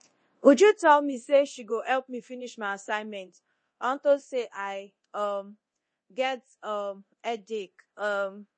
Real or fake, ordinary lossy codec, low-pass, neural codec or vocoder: fake; MP3, 32 kbps; 9.9 kHz; codec, 24 kHz, 0.9 kbps, DualCodec